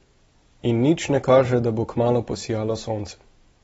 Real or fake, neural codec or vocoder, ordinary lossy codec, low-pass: real; none; AAC, 24 kbps; 19.8 kHz